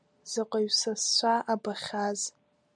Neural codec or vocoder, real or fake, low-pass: none; real; 9.9 kHz